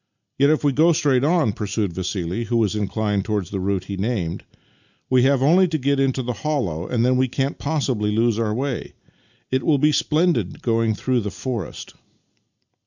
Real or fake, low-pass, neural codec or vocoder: real; 7.2 kHz; none